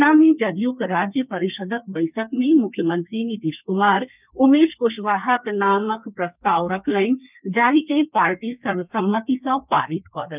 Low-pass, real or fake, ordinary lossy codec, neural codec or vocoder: 3.6 kHz; fake; none; codec, 32 kHz, 1.9 kbps, SNAC